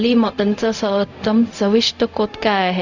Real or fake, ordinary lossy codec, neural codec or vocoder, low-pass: fake; none; codec, 16 kHz, 0.4 kbps, LongCat-Audio-Codec; 7.2 kHz